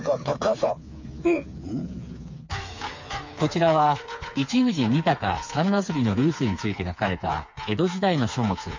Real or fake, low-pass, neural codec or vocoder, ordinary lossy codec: fake; 7.2 kHz; codec, 16 kHz, 4 kbps, FreqCodec, smaller model; MP3, 48 kbps